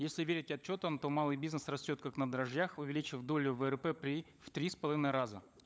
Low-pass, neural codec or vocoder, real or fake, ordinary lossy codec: none; codec, 16 kHz, 16 kbps, FunCodec, trained on LibriTTS, 50 frames a second; fake; none